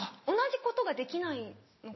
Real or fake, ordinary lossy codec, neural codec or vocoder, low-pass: real; MP3, 24 kbps; none; 7.2 kHz